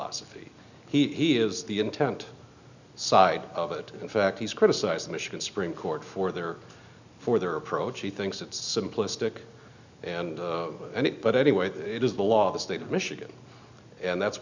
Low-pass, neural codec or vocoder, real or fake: 7.2 kHz; none; real